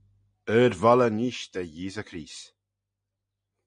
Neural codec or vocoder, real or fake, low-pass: none; real; 9.9 kHz